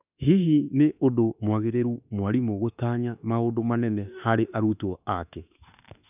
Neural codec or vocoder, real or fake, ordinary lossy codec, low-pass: autoencoder, 48 kHz, 32 numbers a frame, DAC-VAE, trained on Japanese speech; fake; none; 3.6 kHz